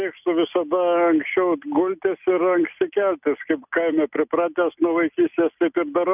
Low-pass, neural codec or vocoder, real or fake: 3.6 kHz; none; real